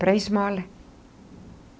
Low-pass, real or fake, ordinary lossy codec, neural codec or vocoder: none; real; none; none